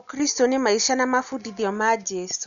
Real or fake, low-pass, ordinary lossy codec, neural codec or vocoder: real; 7.2 kHz; none; none